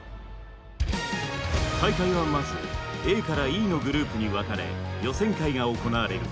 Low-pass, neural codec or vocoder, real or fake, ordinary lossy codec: none; none; real; none